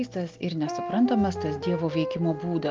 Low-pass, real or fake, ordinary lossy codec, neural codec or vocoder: 7.2 kHz; real; Opus, 32 kbps; none